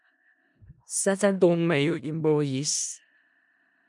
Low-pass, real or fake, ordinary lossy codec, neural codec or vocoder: 10.8 kHz; fake; MP3, 96 kbps; codec, 16 kHz in and 24 kHz out, 0.4 kbps, LongCat-Audio-Codec, four codebook decoder